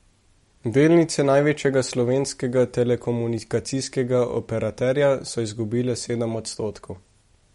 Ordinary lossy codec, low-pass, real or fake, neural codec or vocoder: MP3, 48 kbps; 19.8 kHz; real; none